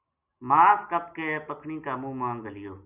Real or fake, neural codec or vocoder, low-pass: real; none; 3.6 kHz